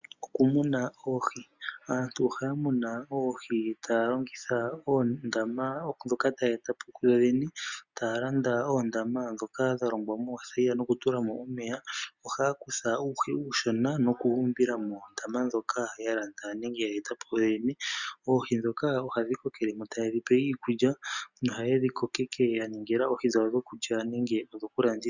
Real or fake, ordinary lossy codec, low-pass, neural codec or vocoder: real; Opus, 64 kbps; 7.2 kHz; none